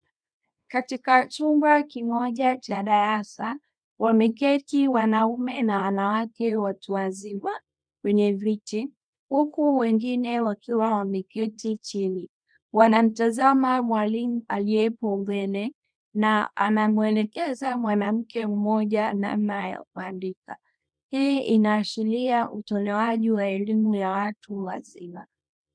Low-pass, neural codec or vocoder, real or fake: 9.9 kHz; codec, 24 kHz, 0.9 kbps, WavTokenizer, small release; fake